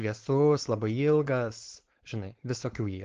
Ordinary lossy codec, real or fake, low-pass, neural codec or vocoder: Opus, 16 kbps; fake; 7.2 kHz; codec, 16 kHz, 4.8 kbps, FACodec